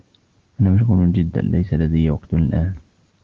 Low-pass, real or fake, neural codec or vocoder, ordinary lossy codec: 7.2 kHz; real; none; Opus, 16 kbps